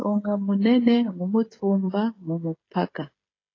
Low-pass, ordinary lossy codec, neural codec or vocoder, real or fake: 7.2 kHz; AAC, 32 kbps; codec, 16 kHz, 16 kbps, FreqCodec, smaller model; fake